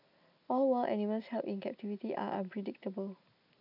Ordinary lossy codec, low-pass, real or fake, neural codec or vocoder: none; 5.4 kHz; real; none